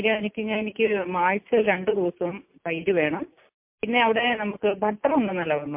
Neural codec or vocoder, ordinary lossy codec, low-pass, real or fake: none; MP3, 32 kbps; 3.6 kHz; real